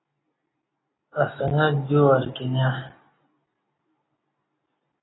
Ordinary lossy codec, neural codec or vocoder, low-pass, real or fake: AAC, 16 kbps; none; 7.2 kHz; real